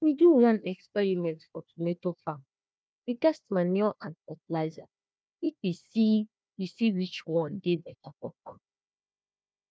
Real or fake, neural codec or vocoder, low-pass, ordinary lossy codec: fake; codec, 16 kHz, 1 kbps, FunCodec, trained on Chinese and English, 50 frames a second; none; none